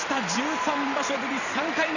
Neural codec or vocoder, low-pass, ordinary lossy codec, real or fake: vocoder, 44.1 kHz, 128 mel bands every 256 samples, BigVGAN v2; 7.2 kHz; none; fake